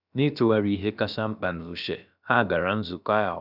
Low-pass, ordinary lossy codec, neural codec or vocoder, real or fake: 5.4 kHz; none; codec, 16 kHz, about 1 kbps, DyCAST, with the encoder's durations; fake